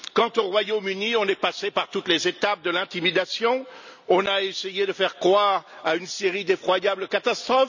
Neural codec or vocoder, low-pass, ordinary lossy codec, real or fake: none; 7.2 kHz; none; real